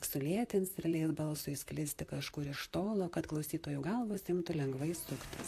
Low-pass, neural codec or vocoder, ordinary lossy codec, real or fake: 14.4 kHz; vocoder, 44.1 kHz, 128 mel bands, Pupu-Vocoder; AAC, 64 kbps; fake